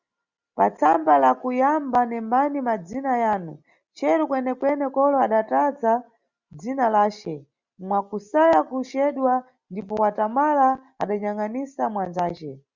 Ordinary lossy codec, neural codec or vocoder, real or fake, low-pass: Opus, 64 kbps; none; real; 7.2 kHz